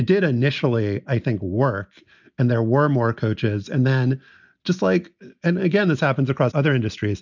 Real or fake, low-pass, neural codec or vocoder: real; 7.2 kHz; none